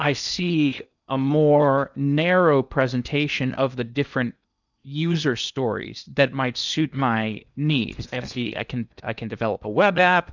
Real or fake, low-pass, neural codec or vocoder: fake; 7.2 kHz; codec, 16 kHz in and 24 kHz out, 0.8 kbps, FocalCodec, streaming, 65536 codes